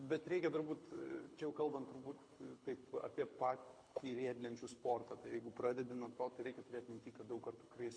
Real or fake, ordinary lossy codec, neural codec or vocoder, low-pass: fake; MP3, 48 kbps; codec, 24 kHz, 6 kbps, HILCodec; 9.9 kHz